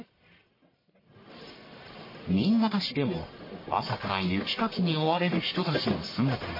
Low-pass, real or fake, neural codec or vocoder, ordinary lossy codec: 5.4 kHz; fake; codec, 44.1 kHz, 1.7 kbps, Pupu-Codec; MP3, 24 kbps